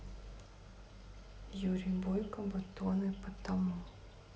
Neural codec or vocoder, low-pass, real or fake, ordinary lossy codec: none; none; real; none